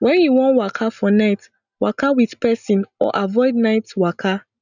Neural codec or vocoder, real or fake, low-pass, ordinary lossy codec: none; real; 7.2 kHz; none